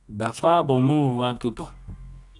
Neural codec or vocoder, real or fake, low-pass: codec, 24 kHz, 0.9 kbps, WavTokenizer, medium music audio release; fake; 10.8 kHz